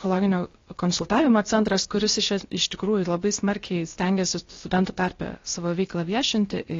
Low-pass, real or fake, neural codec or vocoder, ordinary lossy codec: 7.2 kHz; fake; codec, 16 kHz, about 1 kbps, DyCAST, with the encoder's durations; AAC, 32 kbps